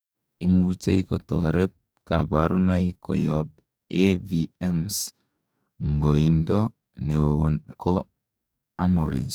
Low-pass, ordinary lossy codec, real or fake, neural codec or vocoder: none; none; fake; codec, 44.1 kHz, 2.6 kbps, DAC